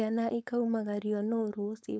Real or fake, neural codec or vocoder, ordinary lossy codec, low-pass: fake; codec, 16 kHz, 4.8 kbps, FACodec; none; none